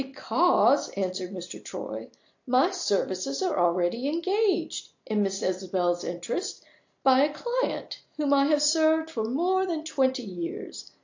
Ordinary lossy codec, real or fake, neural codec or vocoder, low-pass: AAC, 48 kbps; real; none; 7.2 kHz